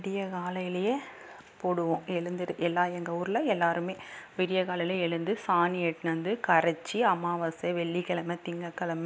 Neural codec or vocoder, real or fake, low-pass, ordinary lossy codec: none; real; none; none